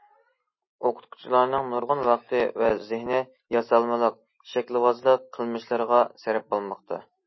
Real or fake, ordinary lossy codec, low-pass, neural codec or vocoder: real; MP3, 24 kbps; 7.2 kHz; none